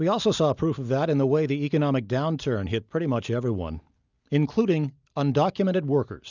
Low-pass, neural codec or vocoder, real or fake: 7.2 kHz; none; real